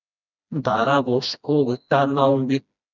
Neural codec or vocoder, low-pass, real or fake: codec, 16 kHz, 1 kbps, FreqCodec, smaller model; 7.2 kHz; fake